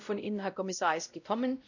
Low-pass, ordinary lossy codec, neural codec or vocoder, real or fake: 7.2 kHz; none; codec, 16 kHz, 0.5 kbps, X-Codec, WavLM features, trained on Multilingual LibriSpeech; fake